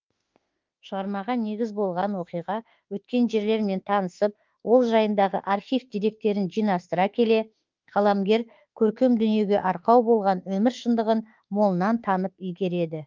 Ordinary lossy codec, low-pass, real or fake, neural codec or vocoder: Opus, 24 kbps; 7.2 kHz; fake; autoencoder, 48 kHz, 32 numbers a frame, DAC-VAE, trained on Japanese speech